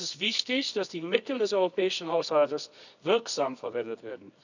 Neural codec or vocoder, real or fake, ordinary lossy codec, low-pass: codec, 24 kHz, 0.9 kbps, WavTokenizer, medium music audio release; fake; none; 7.2 kHz